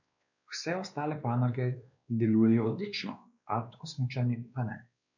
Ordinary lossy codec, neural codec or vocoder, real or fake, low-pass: none; codec, 16 kHz, 2 kbps, X-Codec, WavLM features, trained on Multilingual LibriSpeech; fake; 7.2 kHz